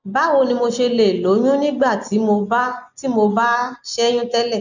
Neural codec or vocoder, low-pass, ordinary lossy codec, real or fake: none; 7.2 kHz; none; real